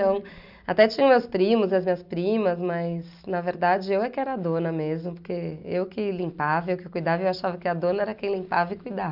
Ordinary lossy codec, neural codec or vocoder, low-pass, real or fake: none; none; 5.4 kHz; real